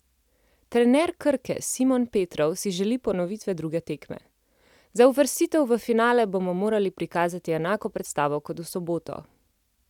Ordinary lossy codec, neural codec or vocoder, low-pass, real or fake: none; none; 19.8 kHz; real